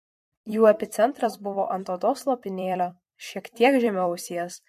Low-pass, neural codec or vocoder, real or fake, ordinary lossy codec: 14.4 kHz; vocoder, 44.1 kHz, 128 mel bands every 256 samples, BigVGAN v2; fake; MP3, 64 kbps